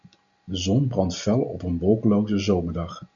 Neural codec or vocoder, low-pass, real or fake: none; 7.2 kHz; real